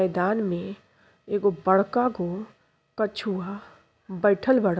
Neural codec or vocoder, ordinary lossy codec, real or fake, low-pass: none; none; real; none